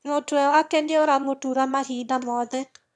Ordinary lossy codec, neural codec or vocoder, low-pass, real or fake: none; autoencoder, 22.05 kHz, a latent of 192 numbers a frame, VITS, trained on one speaker; none; fake